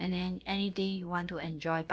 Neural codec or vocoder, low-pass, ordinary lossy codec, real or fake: codec, 16 kHz, about 1 kbps, DyCAST, with the encoder's durations; none; none; fake